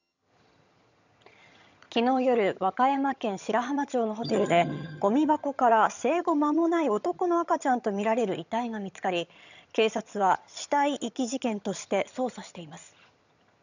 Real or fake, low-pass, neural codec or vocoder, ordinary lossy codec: fake; 7.2 kHz; vocoder, 22.05 kHz, 80 mel bands, HiFi-GAN; none